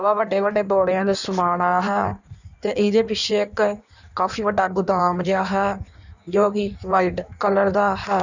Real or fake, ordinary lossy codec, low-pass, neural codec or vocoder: fake; none; 7.2 kHz; codec, 16 kHz in and 24 kHz out, 1.1 kbps, FireRedTTS-2 codec